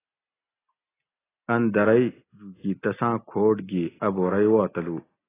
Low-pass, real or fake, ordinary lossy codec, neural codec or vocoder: 3.6 kHz; real; AAC, 16 kbps; none